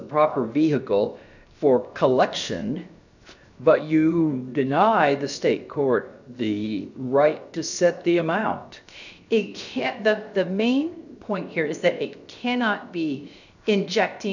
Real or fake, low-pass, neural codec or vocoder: fake; 7.2 kHz; codec, 16 kHz, 0.7 kbps, FocalCodec